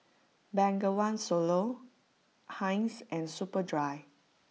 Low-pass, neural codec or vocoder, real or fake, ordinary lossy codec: none; none; real; none